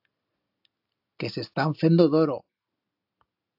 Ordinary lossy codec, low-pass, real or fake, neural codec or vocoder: AAC, 48 kbps; 5.4 kHz; real; none